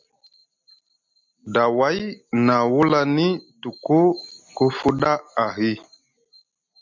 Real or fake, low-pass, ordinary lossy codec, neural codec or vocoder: real; 7.2 kHz; MP3, 64 kbps; none